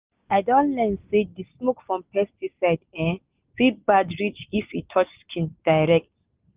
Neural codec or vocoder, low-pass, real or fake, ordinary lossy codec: none; 3.6 kHz; real; Opus, 32 kbps